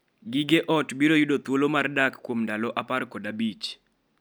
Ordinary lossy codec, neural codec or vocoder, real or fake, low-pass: none; none; real; none